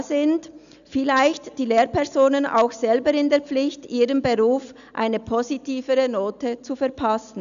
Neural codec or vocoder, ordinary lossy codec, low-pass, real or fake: none; none; 7.2 kHz; real